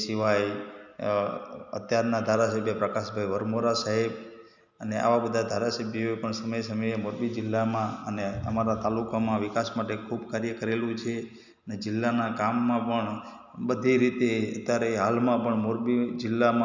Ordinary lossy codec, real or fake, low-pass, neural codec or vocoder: none; real; 7.2 kHz; none